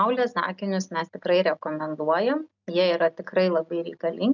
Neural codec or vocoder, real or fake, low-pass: none; real; 7.2 kHz